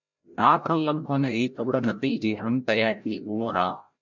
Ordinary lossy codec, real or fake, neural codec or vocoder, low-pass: MP3, 64 kbps; fake; codec, 16 kHz, 0.5 kbps, FreqCodec, larger model; 7.2 kHz